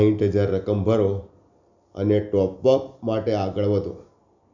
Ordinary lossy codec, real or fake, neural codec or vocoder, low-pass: none; real; none; 7.2 kHz